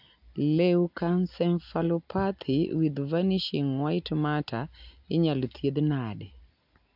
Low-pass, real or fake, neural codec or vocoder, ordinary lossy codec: 5.4 kHz; real; none; AAC, 48 kbps